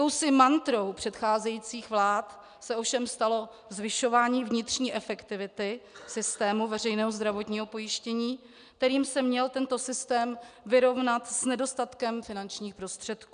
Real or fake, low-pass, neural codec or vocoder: real; 9.9 kHz; none